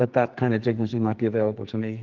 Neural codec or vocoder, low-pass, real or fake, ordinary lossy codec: codec, 44.1 kHz, 2.6 kbps, SNAC; 7.2 kHz; fake; Opus, 32 kbps